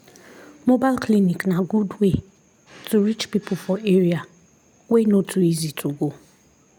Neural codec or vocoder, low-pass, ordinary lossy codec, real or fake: none; 19.8 kHz; none; real